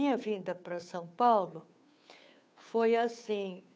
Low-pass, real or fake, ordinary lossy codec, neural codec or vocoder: none; fake; none; codec, 16 kHz, 2 kbps, FunCodec, trained on Chinese and English, 25 frames a second